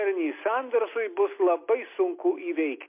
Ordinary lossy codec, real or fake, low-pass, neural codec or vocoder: MP3, 24 kbps; real; 3.6 kHz; none